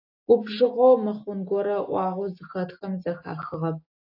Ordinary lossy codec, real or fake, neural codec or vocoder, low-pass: AAC, 32 kbps; real; none; 5.4 kHz